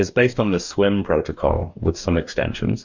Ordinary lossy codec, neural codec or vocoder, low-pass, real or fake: Opus, 64 kbps; codec, 44.1 kHz, 2.6 kbps, DAC; 7.2 kHz; fake